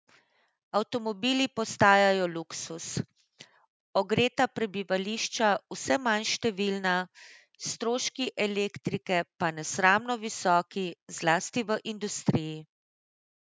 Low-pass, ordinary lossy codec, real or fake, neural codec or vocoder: none; none; real; none